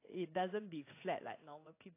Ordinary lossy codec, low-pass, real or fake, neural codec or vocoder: AAC, 24 kbps; 3.6 kHz; fake; codec, 16 kHz in and 24 kHz out, 1 kbps, XY-Tokenizer